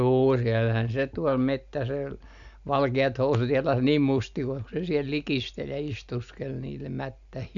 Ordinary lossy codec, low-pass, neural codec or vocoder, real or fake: none; 7.2 kHz; none; real